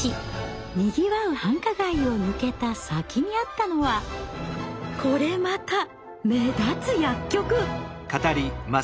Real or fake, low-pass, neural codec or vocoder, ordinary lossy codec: real; none; none; none